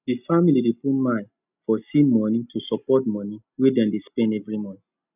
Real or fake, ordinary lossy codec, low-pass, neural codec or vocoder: real; none; 3.6 kHz; none